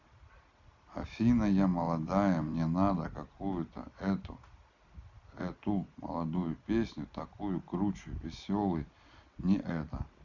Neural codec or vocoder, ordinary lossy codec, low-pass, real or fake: none; none; 7.2 kHz; real